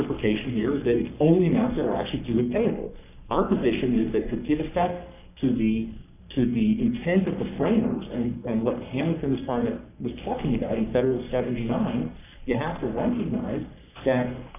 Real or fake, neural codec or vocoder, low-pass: fake; codec, 44.1 kHz, 3.4 kbps, Pupu-Codec; 3.6 kHz